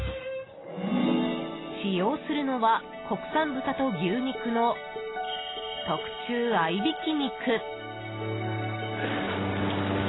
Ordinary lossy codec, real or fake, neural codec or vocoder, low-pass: AAC, 16 kbps; real; none; 7.2 kHz